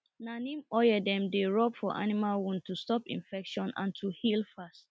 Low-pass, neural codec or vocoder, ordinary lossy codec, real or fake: none; none; none; real